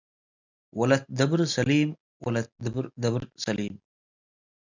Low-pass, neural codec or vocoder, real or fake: 7.2 kHz; none; real